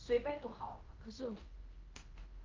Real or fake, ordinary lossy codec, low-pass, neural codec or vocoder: fake; Opus, 24 kbps; 7.2 kHz; codec, 16 kHz in and 24 kHz out, 0.9 kbps, LongCat-Audio-Codec, fine tuned four codebook decoder